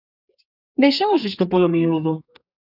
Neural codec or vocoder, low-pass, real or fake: codec, 32 kHz, 1.9 kbps, SNAC; 5.4 kHz; fake